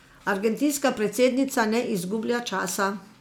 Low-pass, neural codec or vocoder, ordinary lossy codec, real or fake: none; none; none; real